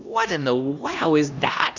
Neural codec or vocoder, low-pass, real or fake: codec, 16 kHz, 1 kbps, X-Codec, HuBERT features, trained on LibriSpeech; 7.2 kHz; fake